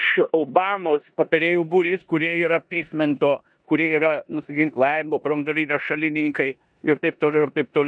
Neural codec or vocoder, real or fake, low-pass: codec, 16 kHz in and 24 kHz out, 0.9 kbps, LongCat-Audio-Codec, four codebook decoder; fake; 9.9 kHz